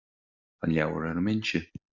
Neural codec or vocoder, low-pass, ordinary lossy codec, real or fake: none; 7.2 kHz; Opus, 64 kbps; real